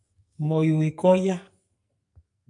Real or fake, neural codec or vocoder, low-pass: fake; codec, 44.1 kHz, 2.6 kbps, SNAC; 10.8 kHz